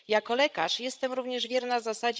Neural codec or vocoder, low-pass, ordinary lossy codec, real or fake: codec, 16 kHz, 16 kbps, FreqCodec, larger model; none; none; fake